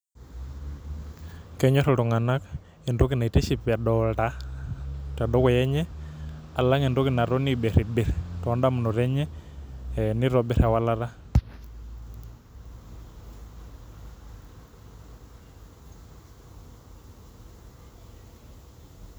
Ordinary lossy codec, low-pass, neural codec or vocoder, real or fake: none; none; none; real